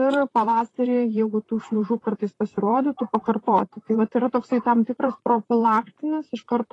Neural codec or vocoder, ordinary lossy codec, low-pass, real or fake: vocoder, 44.1 kHz, 128 mel bands, Pupu-Vocoder; AAC, 32 kbps; 10.8 kHz; fake